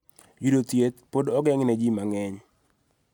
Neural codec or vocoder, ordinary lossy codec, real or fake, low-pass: none; none; real; 19.8 kHz